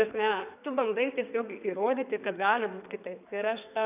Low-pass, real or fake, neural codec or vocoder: 3.6 kHz; fake; codec, 32 kHz, 1.9 kbps, SNAC